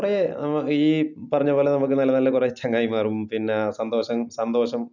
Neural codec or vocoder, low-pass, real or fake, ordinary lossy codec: none; 7.2 kHz; real; none